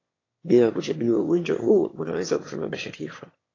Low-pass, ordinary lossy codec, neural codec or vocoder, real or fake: 7.2 kHz; AAC, 32 kbps; autoencoder, 22.05 kHz, a latent of 192 numbers a frame, VITS, trained on one speaker; fake